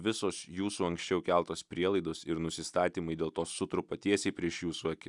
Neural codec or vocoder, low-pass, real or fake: none; 10.8 kHz; real